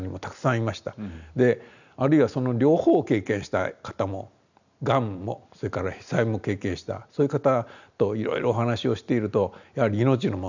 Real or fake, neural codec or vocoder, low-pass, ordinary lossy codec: real; none; 7.2 kHz; none